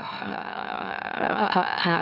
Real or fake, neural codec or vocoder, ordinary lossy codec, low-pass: fake; autoencoder, 44.1 kHz, a latent of 192 numbers a frame, MeloTTS; none; 5.4 kHz